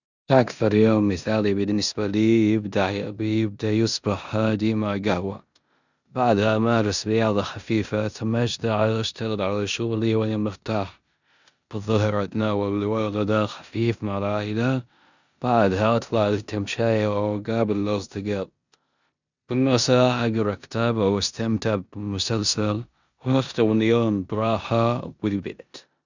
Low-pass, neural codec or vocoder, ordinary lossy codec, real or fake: 7.2 kHz; codec, 16 kHz in and 24 kHz out, 0.9 kbps, LongCat-Audio-Codec, four codebook decoder; none; fake